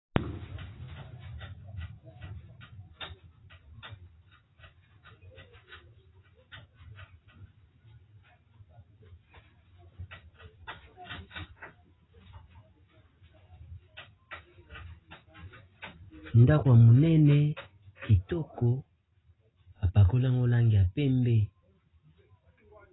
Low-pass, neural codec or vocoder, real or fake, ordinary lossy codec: 7.2 kHz; none; real; AAC, 16 kbps